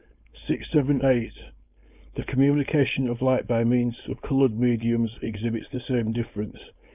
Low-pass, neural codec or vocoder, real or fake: 3.6 kHz; codec, 16 kHz, 4.8 kbps, FACodec; fake